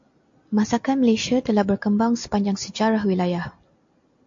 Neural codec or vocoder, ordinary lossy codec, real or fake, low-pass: none; AAC, 64 kbps; real; 7.2 kHz